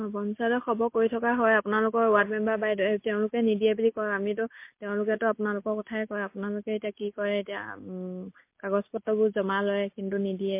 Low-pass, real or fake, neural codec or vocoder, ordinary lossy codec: 3.6 kHz; real; none; MP3, 24 kbps